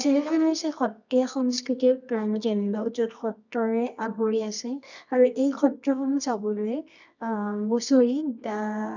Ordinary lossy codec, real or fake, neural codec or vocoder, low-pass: none; fake; codec, 24 kHz, 0.9 kbps, WavTokenizer, medium music audio release; 7.2 kHz